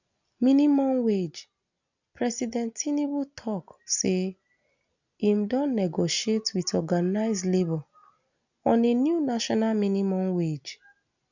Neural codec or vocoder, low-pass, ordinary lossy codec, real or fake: none; 7.2 kHz; none; real